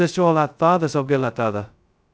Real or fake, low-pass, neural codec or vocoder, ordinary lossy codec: fake; none; codec, 16 kHz, 0.2 kbps, FocalCodec; none